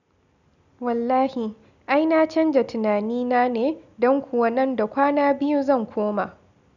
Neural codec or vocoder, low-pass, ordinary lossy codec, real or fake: none; 7.2 kHz; none; real